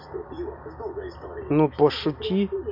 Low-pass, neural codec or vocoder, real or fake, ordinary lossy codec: 5.4 kHz; none; real; MP3, 48 kbps